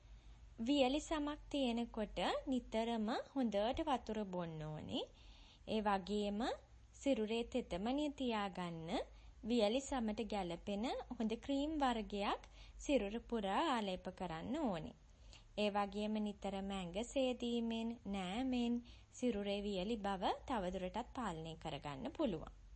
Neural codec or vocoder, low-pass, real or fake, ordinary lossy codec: none; 10.8 kHz; real; MP3, 32 kbps